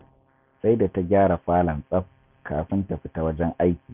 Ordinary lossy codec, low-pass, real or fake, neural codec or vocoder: none; 3.6 kHz; real; none